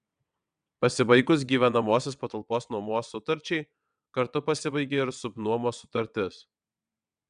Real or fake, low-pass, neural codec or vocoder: fake; 9.9 kHz; vocoder, 22.05 kHz, 80 mel bands, WaveNeXt